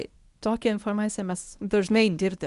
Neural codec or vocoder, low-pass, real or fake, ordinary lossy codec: codec, 24 kHz, 0.9 kbps, WavTokenizer, medium speech release version 1; 10.8 kHz; fake; Opus, 64 kbps